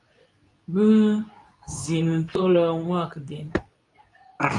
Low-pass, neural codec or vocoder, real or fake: 10.8 kHz; codec, 24 kHz, 0.9 kbps, WavTokenizer, medium speech release version 2; fake